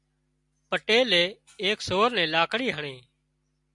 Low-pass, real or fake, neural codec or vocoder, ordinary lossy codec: 10.8 kHz; real; none; MP3, 96 kbps